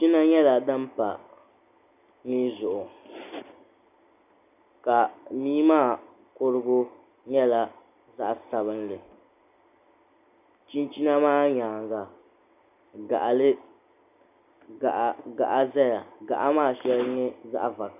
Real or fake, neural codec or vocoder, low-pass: real; none; 3.6 kHz